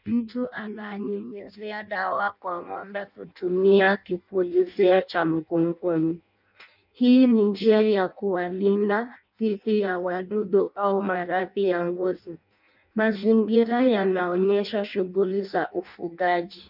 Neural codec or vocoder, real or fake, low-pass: codec, 16 kHz in and 24 kHz out, 0.6 kbps, FireRedTTS-2 codec; fake; 5.4 kHz